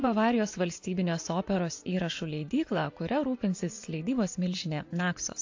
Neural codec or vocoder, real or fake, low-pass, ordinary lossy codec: vocoder, 24 kHz, 100 mel bands, Vocos; fake; 7.2 kHz; AAC, 48 kbps